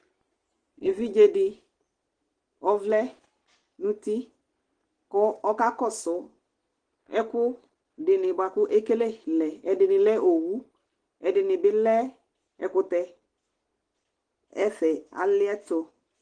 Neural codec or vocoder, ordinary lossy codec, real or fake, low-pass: none; Opus, 16 kbps; real; 9.9 kHz